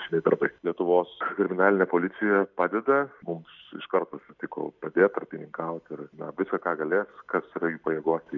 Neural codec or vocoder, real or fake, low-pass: none; real; 7.2 kHz